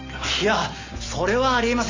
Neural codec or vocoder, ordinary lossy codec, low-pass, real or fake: none; AAC, 48 kbps; 7.2 kHz; real